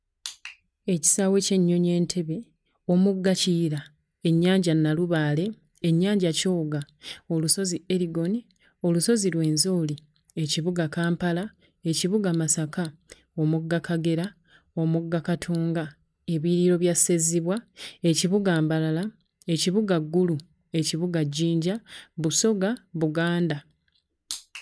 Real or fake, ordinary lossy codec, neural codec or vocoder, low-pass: real; none; none; none